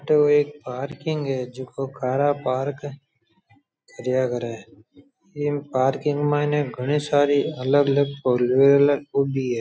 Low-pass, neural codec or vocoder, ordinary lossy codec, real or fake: none; none; none; real